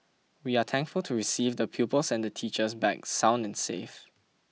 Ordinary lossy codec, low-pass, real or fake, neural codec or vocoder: none; none; real; none